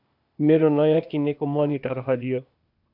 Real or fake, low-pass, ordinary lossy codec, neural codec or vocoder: fake; 5.4 kHz; AAC, 48 kbps; codec, 16 kHz, 0.8 kbps, ZipCodec